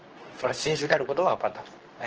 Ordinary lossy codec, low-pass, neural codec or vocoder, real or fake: Opus, 16 kbps; 7.2 kHz; codec, 24 kHz, 0.9 kbps, WavTokenizer, medium speech release version 2; fake